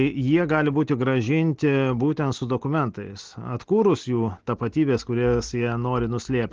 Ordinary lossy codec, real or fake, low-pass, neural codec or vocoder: Opus, 16 kbps; real; 7.2 kHz; none